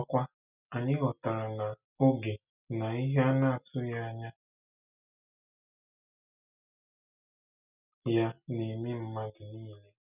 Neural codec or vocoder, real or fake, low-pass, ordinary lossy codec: none; real; 3.6 kHz; none